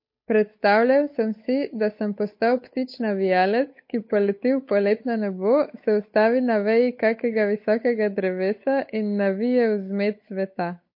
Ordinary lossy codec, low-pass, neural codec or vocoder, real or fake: MP3, 32 kbps; 5.4 kHz; codec, 16 kHz, 8 kbps, FunCodec, trained on Chinese and English, 25 frames a second; fake